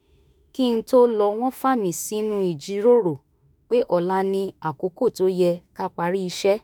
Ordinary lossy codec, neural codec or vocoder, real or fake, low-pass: none; autoencoder, 48 kHz, 32 numbers a frame, DAC-VAE, trained on Japanese speech; fake; none